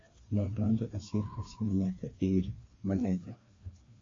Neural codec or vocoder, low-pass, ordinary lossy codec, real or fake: codec, 16 kHz, 2 kbps, FreqCodec, larger model; 7.2 kHz; AAC, 32 kbps; fake